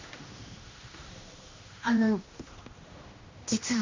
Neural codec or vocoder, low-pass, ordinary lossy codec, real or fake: codec, 16 kHz, 1 kbps, X-Codec, HuBERT features, trained on general audio; 7.2 kHz; MP3, 48 kbps; fake